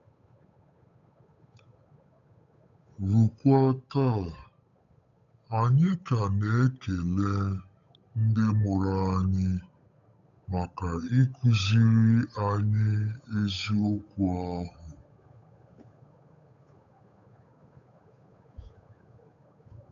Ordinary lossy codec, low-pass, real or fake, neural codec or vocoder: none; 7.2 kHz; fake; codec, 16 kHz, 8 kbps, FunCodec, trained on Chinese and English, 25 frames a second